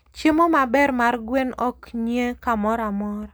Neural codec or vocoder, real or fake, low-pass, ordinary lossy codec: none; real; none; none